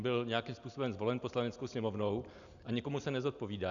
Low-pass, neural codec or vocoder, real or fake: 7.2 kHz; none; real